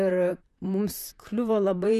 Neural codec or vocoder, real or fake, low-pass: vocoder, 44.1 kHz, 128 mel bands, Pupu-Vocoder; fake; 14.4 kHz